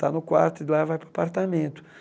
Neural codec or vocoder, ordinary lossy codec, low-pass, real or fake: none; none; none; real